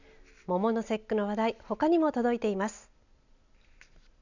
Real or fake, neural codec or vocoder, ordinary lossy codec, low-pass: real; none; none; 7.2 kHz